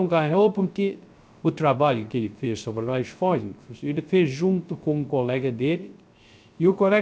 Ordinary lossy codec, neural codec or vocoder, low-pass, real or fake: none; codec, 16 kHz, 0.3 kbps, FocalCodec; none; fake